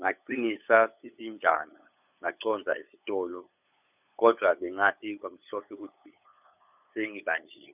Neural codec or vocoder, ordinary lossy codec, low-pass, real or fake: codec, 16 kHz, 8 kbps, FunCodec, trained on LibriTTS, 25 frames a second; none; 3.6 kHz; fake